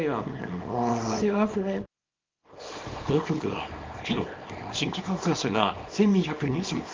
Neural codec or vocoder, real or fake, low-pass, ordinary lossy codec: codec, 24 kHz, 0.9 kbps, WavTokenizer, small release; fake; 7.2 kHz; Opus, 24 kbps